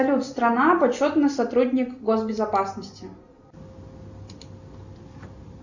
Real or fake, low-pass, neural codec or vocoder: real; 7.2 kHz; none